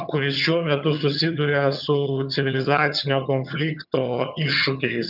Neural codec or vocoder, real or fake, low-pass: vocoder, 22.05 kHz, 80 mel bands, HiFi-GAN; fake; 5.4 kHz